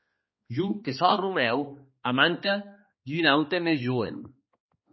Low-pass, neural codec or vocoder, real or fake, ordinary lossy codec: 7.2 kHz; codec, 16 kHz, 2 kbps, X-Codec, HuBERT features, trained on balanced general audio; fake; MP3, 24 kbps